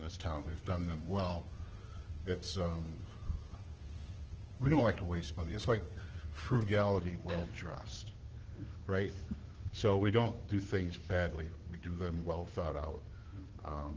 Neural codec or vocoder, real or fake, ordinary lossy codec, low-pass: codec, 16 kHz, 2 kbps, FunCodec, trained on Chinese and English, 25 frames a second; fake; Opus, 16 kbps; 7.2 kHz